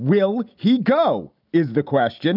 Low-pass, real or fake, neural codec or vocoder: 5.4 kHz; real; none